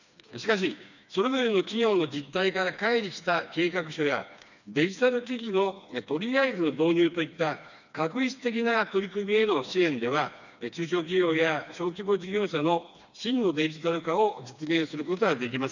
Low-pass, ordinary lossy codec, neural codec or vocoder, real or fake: 7.2 kHz; none; codec, 16 kHz, 2 kbps, FreqCodec, smaller model; fake